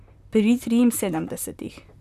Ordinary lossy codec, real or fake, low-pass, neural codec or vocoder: none; fake; 14.4 kHz; vocoder, 44.1 kHz, 128 mel bands, Pupu-Vocoder